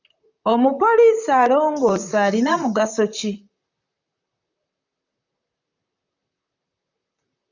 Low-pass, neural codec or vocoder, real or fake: 7.2 kHz; vocoder, 44.1 kHz, 128 mel bands, Pupu-Vocoder; fake